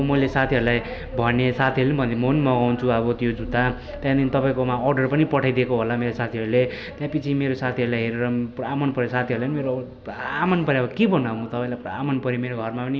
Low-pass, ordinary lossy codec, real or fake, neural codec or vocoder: none; none; real; none